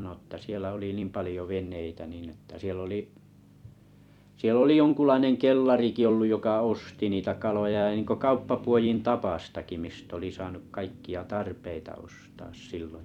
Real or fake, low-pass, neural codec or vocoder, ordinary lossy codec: fake; 19.8 kHz; vocoder, 44.1 kHz, 128 mel bands every 256 samples, BigVGAN v2; none